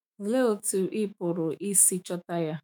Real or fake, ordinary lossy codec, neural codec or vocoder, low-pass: fake; none; autoencoder, 48 kHz, 128 numbers a frame, DAC-VAE, trained on Japanese speech; none